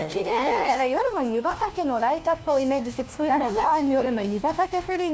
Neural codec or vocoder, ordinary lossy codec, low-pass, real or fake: codec, 16 kHz, 1 kbps, FunCodec, trained on LibriTTS, 50 frames a second; none; none; fake